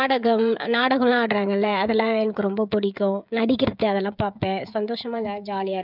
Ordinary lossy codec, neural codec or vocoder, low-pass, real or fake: none; codec, 16 kHz, 16 kbps, FreqCodec, smaller model; 5.4 kHz; fake